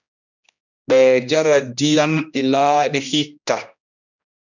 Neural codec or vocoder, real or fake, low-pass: codec, 16 kHz, 1 kbps, X-Codec, HuBERT features, trained on general audio; fake; 7.2 kHz